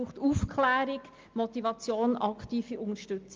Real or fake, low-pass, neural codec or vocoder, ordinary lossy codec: real; 7.2 kHz; none; Opus, 24 kbps